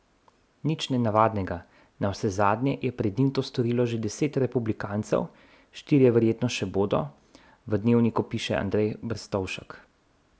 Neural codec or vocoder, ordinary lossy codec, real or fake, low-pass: none; none; real; none